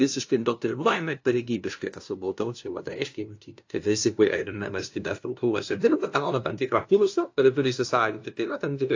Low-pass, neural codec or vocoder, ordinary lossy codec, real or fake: 7.2 kHz; codec, 16 kHz, 0.5 kbps, FunCodec, trained on LibriTTS, 25 frames a second; AAC, 48 kbps; fake